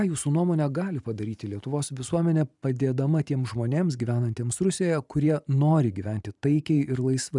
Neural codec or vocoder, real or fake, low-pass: vocoder, 44.1 kHz, 128 mel bands every 512 samples, BigVGAN v2; fake; 10.8 kHz